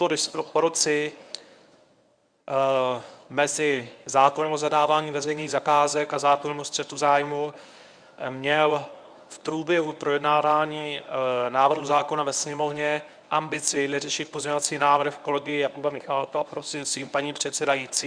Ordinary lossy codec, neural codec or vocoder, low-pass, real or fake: Opus, 64 kbps; codec, 24 kHz, 0.9 kbps, WavTokenizer, medium speech release version 1; 9.9 kHz; fake